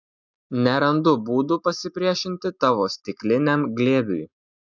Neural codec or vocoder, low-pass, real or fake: none; 7.2 kHz; real